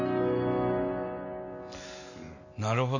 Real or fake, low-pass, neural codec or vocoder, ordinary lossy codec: real; 7.2 kHz; none; none